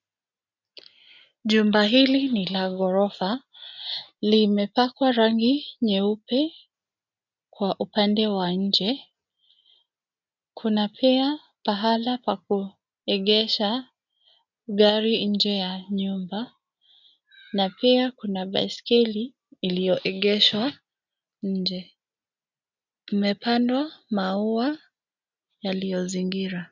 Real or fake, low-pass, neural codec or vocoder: real; 7.2 kHz; none